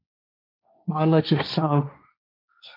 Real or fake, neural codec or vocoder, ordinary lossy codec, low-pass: fake; codec, 16 kHz, 1.1 kbps, Voila-Tokenizer; MP3, 48 kbps; 5.4 kHz